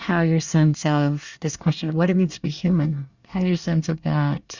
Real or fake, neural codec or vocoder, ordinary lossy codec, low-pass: fake; codec, 24 kHz, 1 kbps, SNAC; Opus, 64 kbps; 7.2 kHz